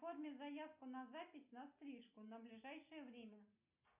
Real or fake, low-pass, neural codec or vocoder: real; 3.6 kHz; none